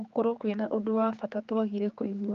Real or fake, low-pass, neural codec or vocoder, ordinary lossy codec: fake; 7.2 kHz; codec, 16 kHz, 4 kbps, X-Codec, HuBERT features, trained on general audio; Opus, 32 kbps